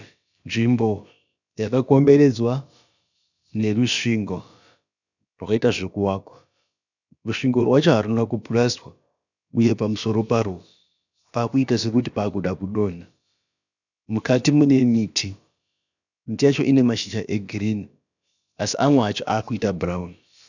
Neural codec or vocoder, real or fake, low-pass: codec, 16 kHz, about 1 kbps, DyCAST, with the encoder's durations; fake; 7.2 kHz